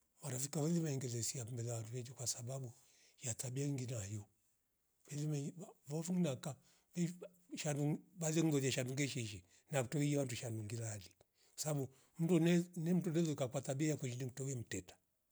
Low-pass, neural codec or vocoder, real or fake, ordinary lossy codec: none; none; real; none